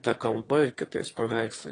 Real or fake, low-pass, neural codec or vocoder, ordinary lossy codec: fake; 9.9 kHz; autoencoder, 22.05 kHz, a latent of 192 numbers a frame, VITS, trained on one speaker; AAC, 32 kbps